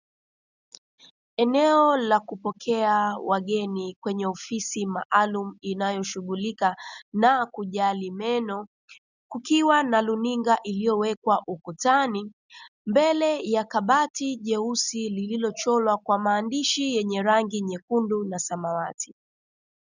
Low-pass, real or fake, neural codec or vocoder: 7.2 kHz; real; none